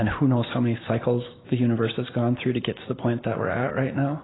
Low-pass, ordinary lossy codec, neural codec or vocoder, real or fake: 7.2 kHz; AAC, 16 kbps; none; real